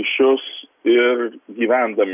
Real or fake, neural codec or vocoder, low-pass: real; none; 3.6 kHz